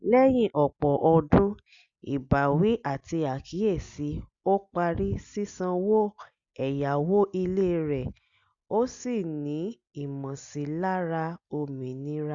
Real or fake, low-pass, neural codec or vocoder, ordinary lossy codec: real; 7.2 kHz; none; Opus, 64 kbps